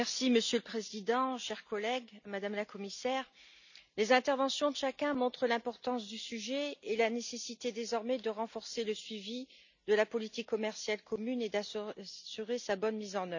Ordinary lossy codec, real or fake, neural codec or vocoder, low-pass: none; real; none; 7.2 kHz